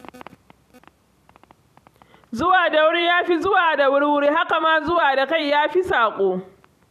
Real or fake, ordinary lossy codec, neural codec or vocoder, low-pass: fake; none; vocoder, 44.1 kHz, 128 mel bands every 256 samples, BigVGAN v2; 14.4 kHz